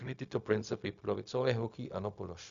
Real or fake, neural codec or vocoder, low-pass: fake; codec, 16 kHz, 0.4 kbps, LongCat-Audio-Codec; 7.2 kHz